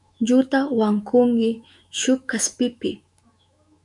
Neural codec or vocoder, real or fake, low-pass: autoencoder, 48 kHz, 128 numbers a frame, DAC-VAE, trained on Japanese speech; fake; 10.8 kHz